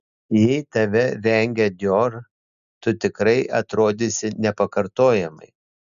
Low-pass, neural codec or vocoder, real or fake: 7.2 kHz; none; real